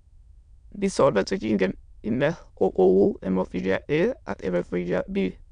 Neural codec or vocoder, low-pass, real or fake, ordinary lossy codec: autoencoder, 22.05 kHz, a latent of 192 numbers a frame, VITS, trained on many speakers; 9.9 kHz; fake; none